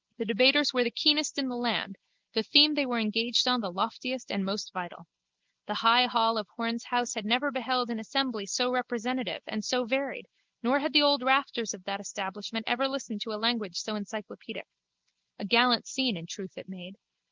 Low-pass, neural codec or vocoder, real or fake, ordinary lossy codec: 7.2 kHz; none; real; Opus, 24 kbps